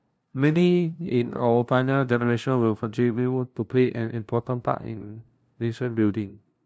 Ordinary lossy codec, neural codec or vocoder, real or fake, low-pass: none; codec, 16 kHz, 0.5 kbps, FunCodec, trained on LibriTTS, 25 frames a second; fake; none